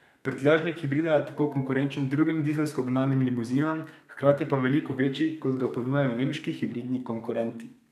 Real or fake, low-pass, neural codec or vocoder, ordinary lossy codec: fake; 14.4 kHz; codec, 32 kHz, 1.9 kbps, SNAC; none